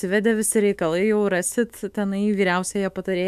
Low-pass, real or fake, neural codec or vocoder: 14.4 kHz; fake; autoencoder, 48 kHz, 128 numbers a frame, DAC-VAE, trained on Japanese speech